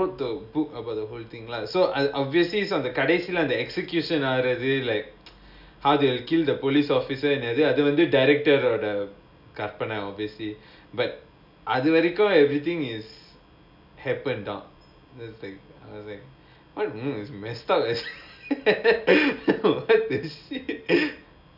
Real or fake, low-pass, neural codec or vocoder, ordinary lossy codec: real; 5.4 kHz; none; none